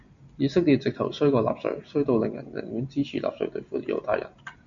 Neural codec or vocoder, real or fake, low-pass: none; real; 7.2 kHz